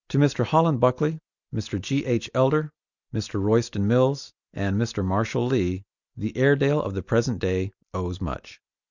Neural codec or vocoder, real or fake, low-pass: none; real; 7.2 kHz